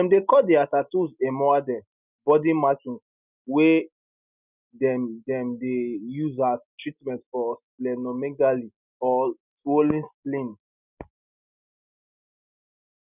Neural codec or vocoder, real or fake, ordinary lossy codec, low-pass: none; real; none; 3.6 kHz